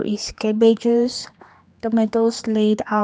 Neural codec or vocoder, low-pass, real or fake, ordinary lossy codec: codec, 16 kHz, 2 kbps, X-Codec, HuBERT features, trained on general audio; none; fake; none